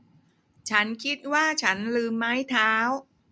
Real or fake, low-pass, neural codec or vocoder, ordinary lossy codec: real; none; none; none